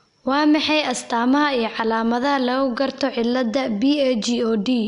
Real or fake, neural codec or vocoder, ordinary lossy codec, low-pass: real; none; none; 10.8 kHz